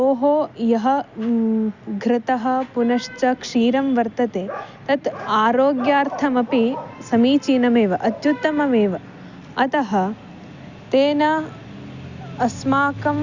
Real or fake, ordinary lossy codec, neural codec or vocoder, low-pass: real; Opus, 64 kbps; none; 7.2 kHz